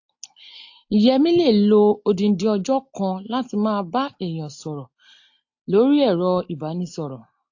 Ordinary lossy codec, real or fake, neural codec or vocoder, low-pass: AAC, 48 kbps; real; none; 7.2 kHz